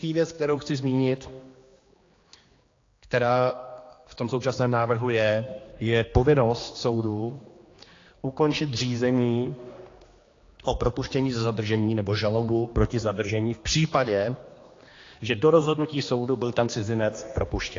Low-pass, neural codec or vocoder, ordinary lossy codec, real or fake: 7.2 kHz; codec, 16 kHz, 2 kbps, X-Codec, HuBERT features, trained on general audio; AAC, 32 kbps; fake